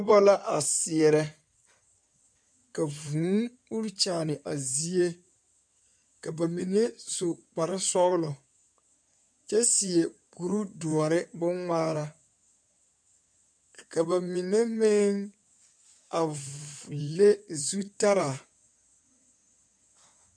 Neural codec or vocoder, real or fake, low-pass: codec, 16 kHz in and 24 kHz out, 2.2 kbps, FireRedTTS-2 codec; fake; 9.9 kHz